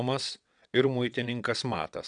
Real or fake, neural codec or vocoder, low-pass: fake; vocoder, 22.05 kHz, 80 mel bands, WaveNeXt; 9.9 kHz